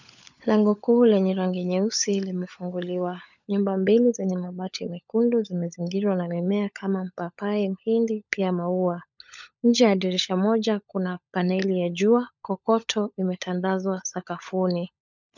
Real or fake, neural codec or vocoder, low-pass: fake; codec, 16 kHz, 16 kbps, FunCodec, trained on LibriTTS, 50 frames a second; 7.2 kHz